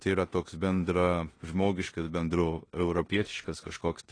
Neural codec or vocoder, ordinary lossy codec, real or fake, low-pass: codec, 16 kHz in and 24 kHz out, 0.9 kbps, LongCat-Audio-Codec, four codebook decoder; AAC, 32 kbps; fake; 9.9 kHz